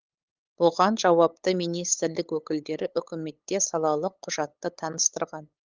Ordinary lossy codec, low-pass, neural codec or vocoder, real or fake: Opus, 24 kbps; 7.2 kHz; codec, 16 kHz, 8 kbps, FunCodec, trained on LibriTTS, 25 frames a second; fake